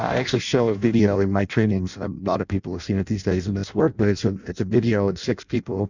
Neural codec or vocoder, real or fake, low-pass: codec, 16 kHz in and 24 kHz out, 0.6 kbps, FireRedTTS-2 codec; fake; 7.2 kHz